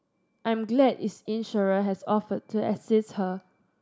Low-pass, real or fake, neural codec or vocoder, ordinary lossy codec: none; real; none; none